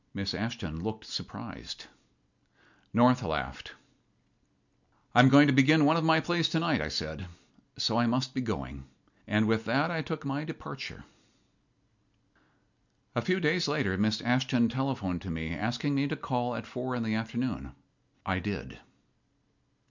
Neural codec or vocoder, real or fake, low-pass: none; real; 7.2 kHz